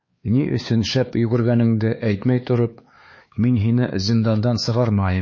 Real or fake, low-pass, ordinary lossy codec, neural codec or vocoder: fake; 7.2 kHz; MP3, 32 kbps; codec, 16 kHz, 4 kbps, X-Codec, HuBERT features, trained on LibriSpeech